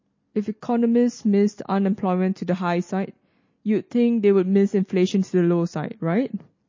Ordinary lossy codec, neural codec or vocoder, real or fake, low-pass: MP3, 32 kbps; none; real; 7.2 kHz